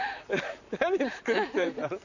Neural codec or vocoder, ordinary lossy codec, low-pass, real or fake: vocoder, 44.1 kHz, 128 mel bands, Pupu-Vocoder; none; 7.2 kHz; fake